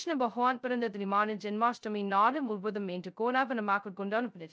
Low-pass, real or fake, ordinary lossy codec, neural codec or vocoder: none; fake; none; codec, 16 kHz, 0.2 kbps, FocalCodec